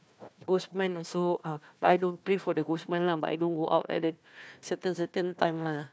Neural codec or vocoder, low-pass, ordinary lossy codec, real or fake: codec, 16 kHz, 1 kbps, FunCodec, trained on Chinese and English, 50 frames a second; none; none; fake